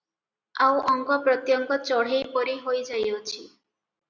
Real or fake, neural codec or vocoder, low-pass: real; none; 7.2 kHz